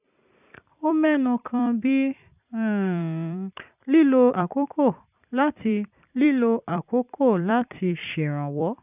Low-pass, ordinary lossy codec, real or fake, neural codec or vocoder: 3.6 kHz; none; fake; vocoder, 44.1 kHz, 128 mel bands every 256 samples, BigVGAN v2